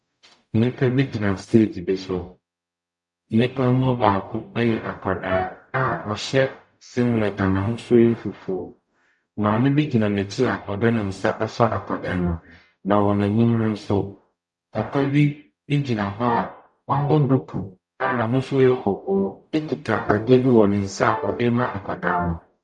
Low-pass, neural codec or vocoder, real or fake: 10.8 kHz; codec, 44.1 kHz, 0.9 kbps, DAC; fake